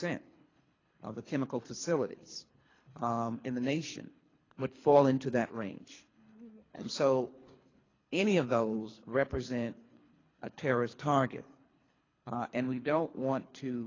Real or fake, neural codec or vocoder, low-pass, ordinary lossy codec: fake; codec, 24 kHz, 3 kbps, HILCodec; 7.2 kHz; AAC, 32 kbps